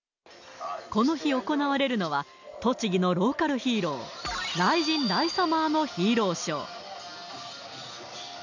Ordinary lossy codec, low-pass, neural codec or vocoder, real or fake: none; 7.2 kHz; none; real